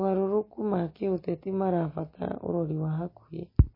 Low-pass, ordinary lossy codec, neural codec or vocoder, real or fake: 5.4 kHz; MP3, 24 kbps; none; real